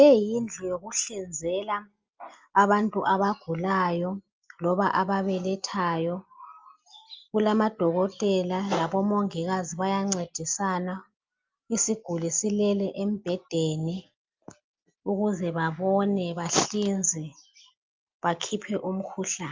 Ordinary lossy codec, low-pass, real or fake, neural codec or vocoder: Opus, 24 kbps; 7.2 kHz; real; none